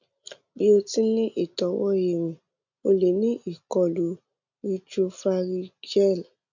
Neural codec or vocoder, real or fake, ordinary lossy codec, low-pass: none; real; none; 7.2 kHz